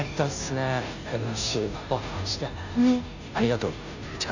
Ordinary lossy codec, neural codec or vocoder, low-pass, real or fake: none; codec, 16 kHz, 0.5 kbps, FunCodec, trained on Chinese and English, 25 frames a second; 7.2 kHz; fake